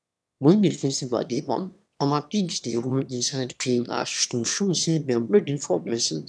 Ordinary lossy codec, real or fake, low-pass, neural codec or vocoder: none; fake; none; autoencoder, 22.05 kHz, a latent of 192 numbers a frame, VITS, trained on one speaker